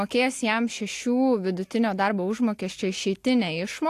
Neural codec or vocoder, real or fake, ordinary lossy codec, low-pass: none; real; AAC, 64 kbps; 14.4 kHz